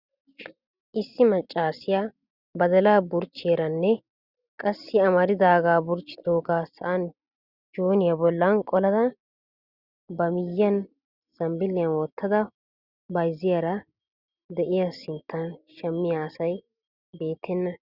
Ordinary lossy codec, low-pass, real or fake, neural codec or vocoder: Opus, 64 kbps; 5.4 kHz; real; none